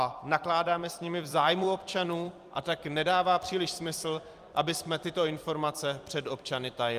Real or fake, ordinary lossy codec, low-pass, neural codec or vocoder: fake; Opus, 32 kbps; 14.4 kHz; vocoder, 44.1 kHz, 128 mel bands every 256 samples, BigVGAN v2